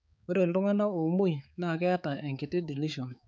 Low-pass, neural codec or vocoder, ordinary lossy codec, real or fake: none; codec, 16 kHz, 4 kbps, X-Codec, HuBERT features, trained on balanced general audio; none; fake